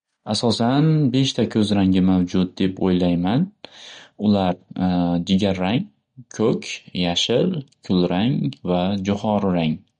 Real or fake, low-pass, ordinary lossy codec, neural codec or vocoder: real; 9.9 kHz; MP3, 48 kbps; none